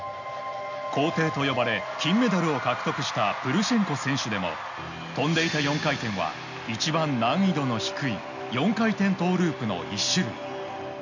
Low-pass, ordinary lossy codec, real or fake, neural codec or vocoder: 7.2 kHz; none; real; none